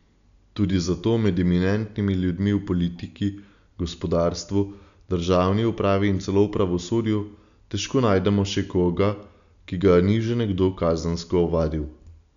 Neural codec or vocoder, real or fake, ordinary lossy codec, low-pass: none; real; none; 7.2 kHz